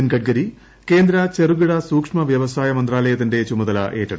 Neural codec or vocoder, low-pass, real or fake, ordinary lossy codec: none; none; real; none